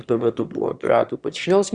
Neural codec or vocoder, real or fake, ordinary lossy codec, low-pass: autoencoder, 22.05 kHz, a latent of 192 numbers a frame, VITS, trained on one speaker; fake; Opus, 64 kbps; 9.9 kHz